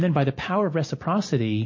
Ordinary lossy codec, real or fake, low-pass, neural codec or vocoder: MP3, 32 kbps; real; 7.2 kHz; none